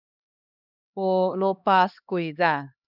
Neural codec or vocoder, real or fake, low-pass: codec, 16 kHz, 2 kbps, X-Codec, HuBERT features, trained on LibriSpeech; fake; 5.4 kHz